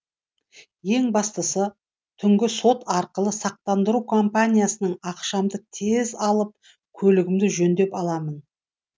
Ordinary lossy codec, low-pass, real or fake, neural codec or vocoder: none; none; real; none